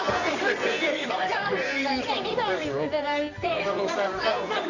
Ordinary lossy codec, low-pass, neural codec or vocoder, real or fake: none; 7.2 kHz; codec, 24 kHz, 0.9 kbps, WavTokenizer, medium music audio release; fake